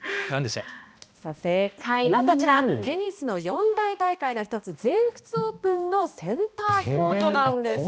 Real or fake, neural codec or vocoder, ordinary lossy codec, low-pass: fake; codec, 16 kHz, 1 kbps, X-Codec, HuBERT features, trained on balanced general audio; none; none